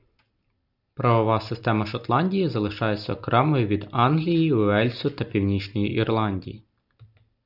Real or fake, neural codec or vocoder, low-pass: real; none; 5.4 kHz